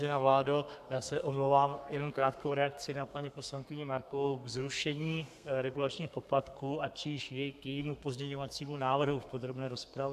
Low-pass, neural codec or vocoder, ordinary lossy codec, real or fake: 14.4 kHz; codec, 32 kHz, 1.9 kbps, SNAC; AAC, 96 kbps; fake